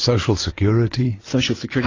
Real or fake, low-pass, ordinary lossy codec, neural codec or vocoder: real; 7.2 kHz; AAC, 32 kbps; none